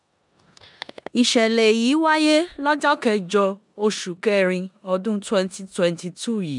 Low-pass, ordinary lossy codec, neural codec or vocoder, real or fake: 10.8 kHz; none; codec, 16 kHz in and 24 kHz out, 0.9 kbps, LongCat-Audio-Codec, four codebook decoder; fake